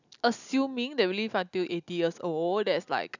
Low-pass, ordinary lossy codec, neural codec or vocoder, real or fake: 7.2 kHz; none; none; real